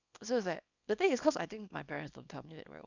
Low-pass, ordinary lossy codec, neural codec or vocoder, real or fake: 7.2 kHz; none; codec, 24 kHz, 0.9 kbps, WavTokenizer, small release; fake